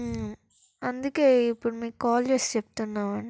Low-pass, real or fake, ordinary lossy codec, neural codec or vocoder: none; real; none; none